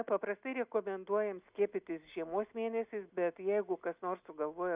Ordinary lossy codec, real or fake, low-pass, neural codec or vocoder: Opus, 64 kbps; real; 3.6 kHz; none